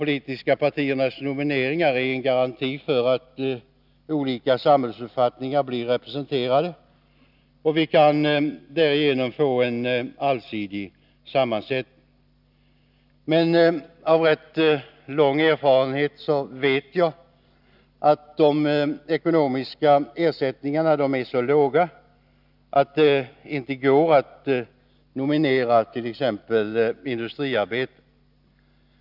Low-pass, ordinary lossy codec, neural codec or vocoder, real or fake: 5.4 kHz; none; none; real